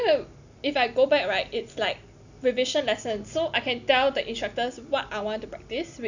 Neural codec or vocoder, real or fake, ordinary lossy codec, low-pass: none; real; none; 7.2 kHz